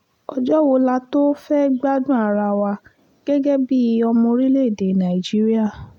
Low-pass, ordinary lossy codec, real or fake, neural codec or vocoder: 19.8 kHz; none; real; none